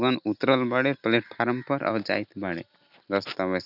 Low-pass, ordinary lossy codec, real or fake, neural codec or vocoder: 5.4 kHz; none; real; none